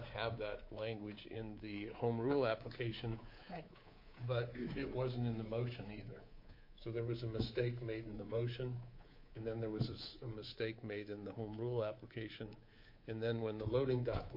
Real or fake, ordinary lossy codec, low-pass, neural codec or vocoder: fake; MP3, 32 kbps; 5.4 kHz; codec, 24 kHz, 3.1 kbps, DualCodec